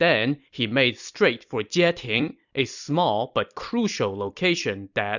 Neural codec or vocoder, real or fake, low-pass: vocoder, 44.1 kHz, 128 mel bands every 256 samples, BigVGAN v2; fake; 7.2 kHz